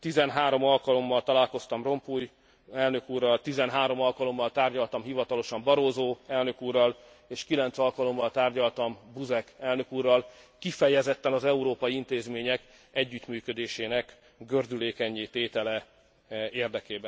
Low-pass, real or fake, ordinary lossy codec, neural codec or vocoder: none; real; none; none